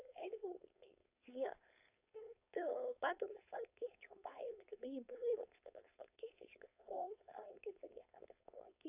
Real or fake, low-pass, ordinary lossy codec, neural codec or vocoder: fake; 3.6 kHz; AAC, 32 kbps; codec, 16 kHz, 4.8 kbps, FACodec